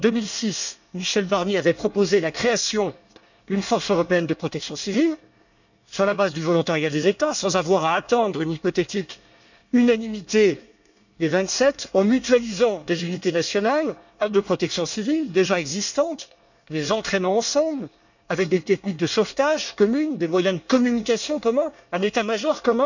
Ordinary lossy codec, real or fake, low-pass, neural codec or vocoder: none; fake; 7.2 kHz; codec, 24 kHz, 1 kbps, SNAC